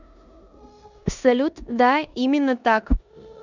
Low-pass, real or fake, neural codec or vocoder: 7.2 kHz; fake; codec, 16 kHz in and 24 kHz out, 0.9 kbps, LongCat-Audio-Codec, four codebook decoder